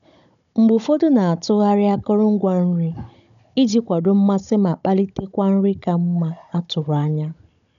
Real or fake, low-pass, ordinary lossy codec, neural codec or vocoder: fake; 7.2 kHz; none; codec, 16 kHz, 16 kbps, FunCodec, trained on Chinese and English, 50 frames a second